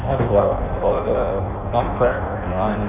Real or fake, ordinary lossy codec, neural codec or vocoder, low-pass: fake; none; codec, 16 kHz in and 24 kHz out, 0.6 kbps, FireRedTTS-2 codec; 3.6 kHz